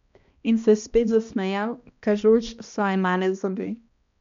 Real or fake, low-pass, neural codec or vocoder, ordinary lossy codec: fake; 7.2 kHz; codec, 16 kHz, 1 kbps, X-Codec, HuBERT features, trained on balanced general audio; MP3, 64 kbps